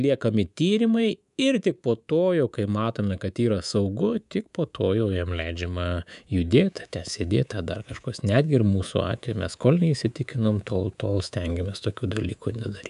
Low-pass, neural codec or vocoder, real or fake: 10.8 kHz; codec, 24 kHz, 3.1 kbps, DualCodec; fake